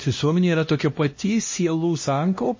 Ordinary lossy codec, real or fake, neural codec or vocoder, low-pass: MP3, 32 kbps; fake; codec, 16 kHz, 1 kbps, X-Codec, HuBERT features, trained on LibriSpeech; 7.2 kHz